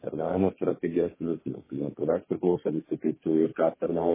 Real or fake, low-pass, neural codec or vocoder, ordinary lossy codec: fake; 3.6 kHz; codec, 32 kHz, 1.9 kbps, SNAC; MP3, 16 kbps